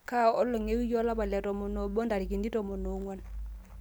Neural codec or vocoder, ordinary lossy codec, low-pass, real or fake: none; none; none; real